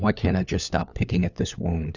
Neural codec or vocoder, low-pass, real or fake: codec, 16 kHz, 4 kbps, FunCodec, trained on LibriTTS, 50 frames a second; 7.2 kHz; fake